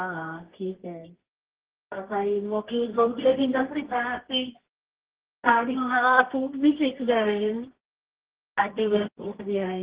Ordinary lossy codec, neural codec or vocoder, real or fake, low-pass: Opus, 16 kbps; codec, 24 kHz, 0.9 kbps, WavTokenizer, medium music audio release; fake; 3.6 kHz